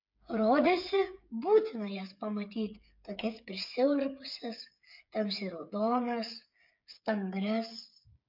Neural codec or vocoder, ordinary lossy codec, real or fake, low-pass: codec, 16 kHz, 8 kbps, FreqCodec, smaller model; MP3, 48 kbps; fake; 5.4 kHz